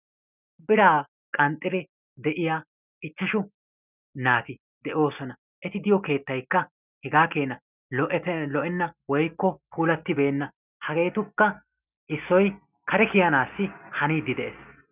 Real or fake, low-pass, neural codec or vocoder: real; 3.6 kHz; none